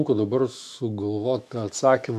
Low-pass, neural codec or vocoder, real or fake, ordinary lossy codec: 14.4 kHz; autoencoder, 48 kHz, 128 numbers a frame, DAC-VAE, trained on Japanese speech; fake; AAC, 96 kbps